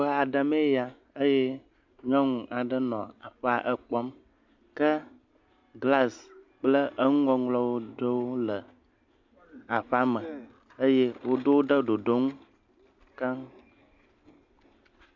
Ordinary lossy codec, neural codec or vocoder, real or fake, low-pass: MP3, 64 kbps; none; real; 7.2 kHz